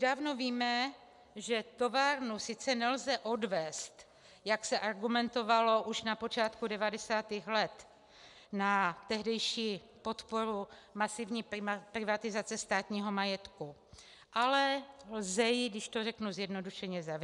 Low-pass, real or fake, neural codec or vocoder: 10.8 kHz; real; none